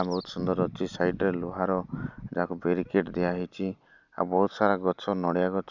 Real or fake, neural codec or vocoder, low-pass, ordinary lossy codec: real; none; 7.2 kHz; none